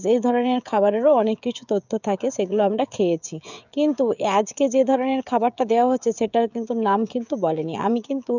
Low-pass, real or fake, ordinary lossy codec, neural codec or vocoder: 7.2 kHz; fake; none; codec, 16 kHz, 16 kbps, FreqCodec, smaller model